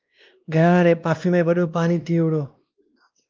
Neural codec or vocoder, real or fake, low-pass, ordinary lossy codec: codec, 16 kHz, 1 kbps, X-Codec, WavLM features, trained on Multilingual LibriSpeech; fake; 7.2 kHz; Opus, 32 kbps